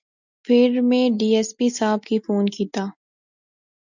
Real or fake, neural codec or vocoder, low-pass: real; none; 7.2 kHz